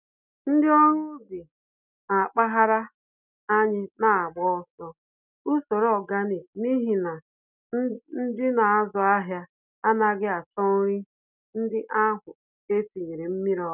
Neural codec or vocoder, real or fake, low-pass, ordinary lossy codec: none; real; 3.6 kHz; none